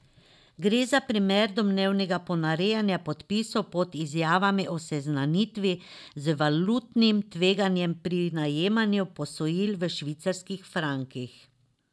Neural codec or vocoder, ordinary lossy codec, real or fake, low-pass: none; none; real; none